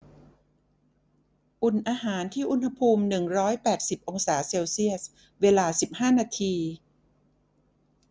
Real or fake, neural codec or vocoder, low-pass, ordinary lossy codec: real; none; none; none